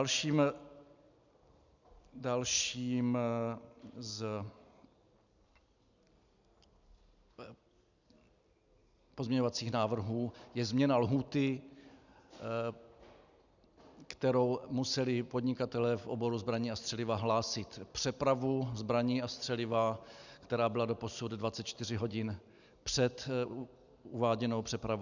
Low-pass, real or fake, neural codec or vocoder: 7.2 kHz; real; none